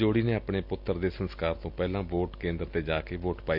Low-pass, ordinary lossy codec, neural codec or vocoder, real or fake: 5.4 kHz; none; none; real